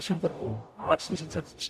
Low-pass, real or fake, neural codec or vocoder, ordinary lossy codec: 14.4 kHz; fake; codec, 44.1 kHz, 0.9 kbps, DAC; AAC, 96 kbps